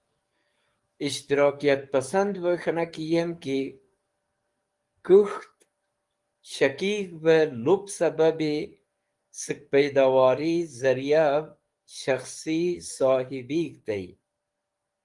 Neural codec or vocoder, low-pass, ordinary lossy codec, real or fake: codec, 44.1 kHz, 7.8 kbps, DAC; 10.8 kHz; Opus, 24 kbps; fake